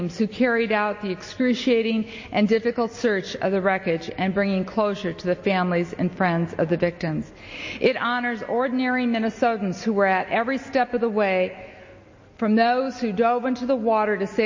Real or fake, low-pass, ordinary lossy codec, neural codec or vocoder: real; 7.2 kHz; MP3, 32 kbps; none